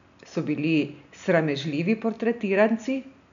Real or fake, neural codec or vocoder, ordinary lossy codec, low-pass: real; none; none; 7.2 kHz